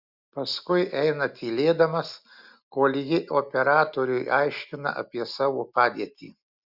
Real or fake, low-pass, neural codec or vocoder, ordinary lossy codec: real; 5.4 kHz; none; Opus, 64 kbps